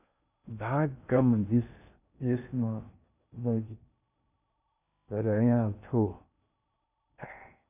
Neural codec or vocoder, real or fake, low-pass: codec, 16 kHz in and 24 kHz out, 0.6 kbps, FocalCodec, streaming, 2048 codes; fake; 3.6 kHz